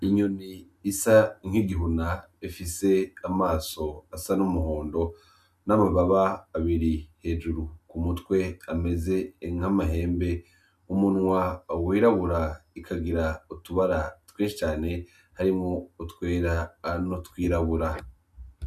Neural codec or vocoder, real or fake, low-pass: none; real; 14.4 kHz